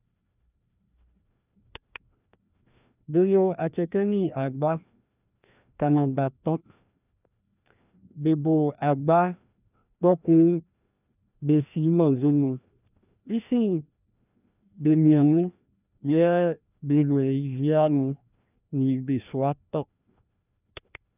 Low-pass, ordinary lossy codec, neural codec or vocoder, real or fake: 3.6 kHz; none; codec, 16 kHz, 1 kbps, FreqCodec, larger model; fake